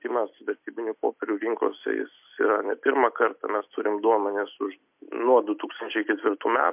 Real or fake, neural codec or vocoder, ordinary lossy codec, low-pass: real; none; MP3, 32 kbps; 3.6 kHz